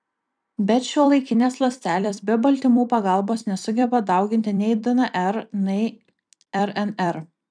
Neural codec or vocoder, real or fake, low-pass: vocoder, 44.1 kHz, 128 mel bands every 256 samples, BigVGAN v2; fake; 9.9 kHz